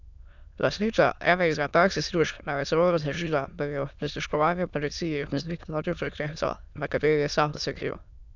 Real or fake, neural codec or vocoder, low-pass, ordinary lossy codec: fake; autoencoder, 22.05 kHz, a latent of 192 numbers a frame, VITS, trained on many speakers; 7.2 kHz; none